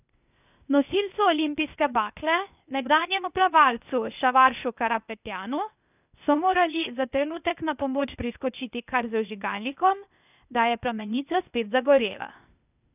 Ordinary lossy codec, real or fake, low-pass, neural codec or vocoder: none; fake; 3.6 kHz; codec, 16 kHz, 0.8 kbps, ZipCodec